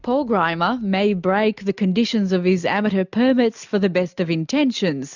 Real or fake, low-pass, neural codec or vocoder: real; 7.2 kHz; none